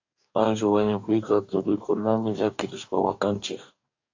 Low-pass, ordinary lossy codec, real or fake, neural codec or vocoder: 7.2 kHz; AAC, 48 kbps; fake; codec, 44.1 kHz, 2.6 kbps, DAC